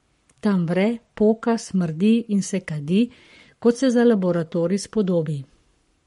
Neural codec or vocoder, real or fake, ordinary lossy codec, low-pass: codec, 44.1 kHz, 7.8 kbps, Pupu-Codec; fake; MP3, 48 kbps; 19.8 kHz